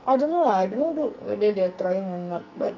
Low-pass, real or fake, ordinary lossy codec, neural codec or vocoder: 7.2 kHz; fake; none; codec, 44.1 kHz, 2.6 kbps, SNAC